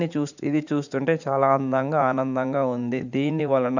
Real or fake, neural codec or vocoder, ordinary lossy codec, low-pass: fake; vocoder, 44.1 kHz, 80 mel bands, Vocos; none; 7.2 kHz